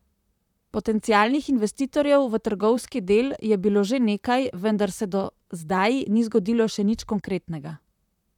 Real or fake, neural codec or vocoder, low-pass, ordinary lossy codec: fake; vocoder, 44.1 kHz, 128 mel bands, Pupu-Vocoder; 19.8 kHz; none